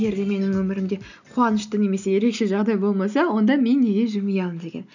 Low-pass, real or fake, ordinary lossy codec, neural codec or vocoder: 7.2 kHz; real; none; none